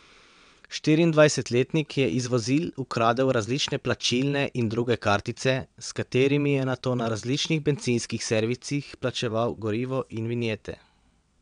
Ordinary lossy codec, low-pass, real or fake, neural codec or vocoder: MP3, 96 kbps; 9.9 kHz; fake; vocoder, 22.05 kHz, 80 mel bands, WaveNeXt